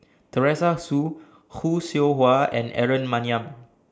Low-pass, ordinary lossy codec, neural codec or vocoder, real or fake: none; none; none; real